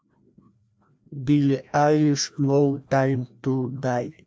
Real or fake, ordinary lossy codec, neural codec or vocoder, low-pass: fake; none; codec, 16 kHz, 1 kbps, FreqCodec, larger model; none